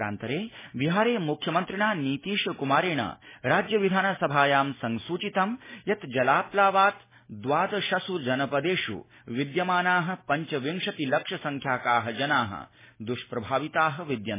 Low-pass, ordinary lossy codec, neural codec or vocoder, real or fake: 3.6 kHz; MP3, 16 kbps; none; real